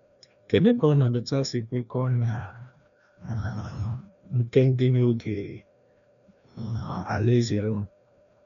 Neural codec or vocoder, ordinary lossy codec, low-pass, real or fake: codec, 16 kHz, 1 kbps, FreqCodec, larger model; none; 7.2 kHz; fake